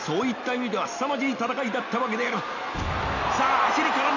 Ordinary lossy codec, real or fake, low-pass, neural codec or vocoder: none; real; 7.2 kHz; none